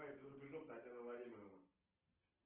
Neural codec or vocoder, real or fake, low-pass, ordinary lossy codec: none; real; 3.6 kHz; Opus, 16 kbps